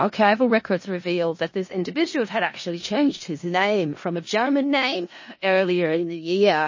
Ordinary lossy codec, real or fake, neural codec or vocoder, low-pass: MP3, 32 kbps; fake; codec, 16 kHz in and 24 kHz out, 0.4 kbps, LongCat-Audio-Codec, four codebook decoder; 7.2 kHz